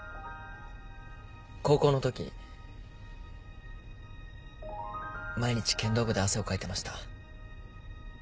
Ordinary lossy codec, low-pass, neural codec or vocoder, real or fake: none; none; none; real